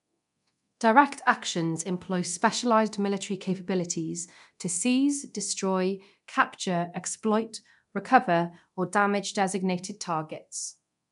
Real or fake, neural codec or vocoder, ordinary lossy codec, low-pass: fake; codec, 24 kHz, 0.9 kbps, DualCodec; none; 10.8 kHz